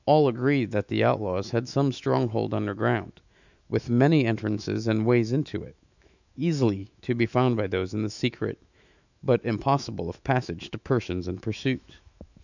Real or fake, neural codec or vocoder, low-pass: fake; autoencoder, 48 kHz, 128 numbers a frame, DAC-VAE, trained on Japanese speech; 7.2 kHz